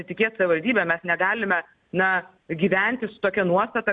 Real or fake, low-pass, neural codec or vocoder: real; 9.9 kHz; none